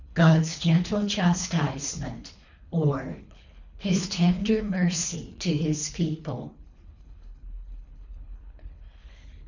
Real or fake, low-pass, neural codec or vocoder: fake; 7.2 kHz; codec, 24 kHz, 3 kbps, HILCodec